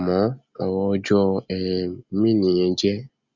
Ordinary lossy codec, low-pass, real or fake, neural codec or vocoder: Opus, 64 kbps; 7.2 kHz; real; none